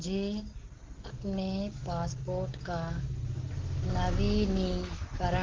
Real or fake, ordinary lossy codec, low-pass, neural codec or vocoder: real; Opus, 16 kbps; 7.2 kHz; none